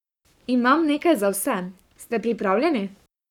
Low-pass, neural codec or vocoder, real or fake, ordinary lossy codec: 19.8 kHz; codec, 44.1 kHz, 7.8 kbps, Pupu-Codec; fake; none